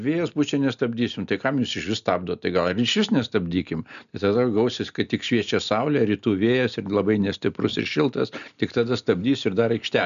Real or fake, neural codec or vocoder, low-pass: real; none; 7.2 kHz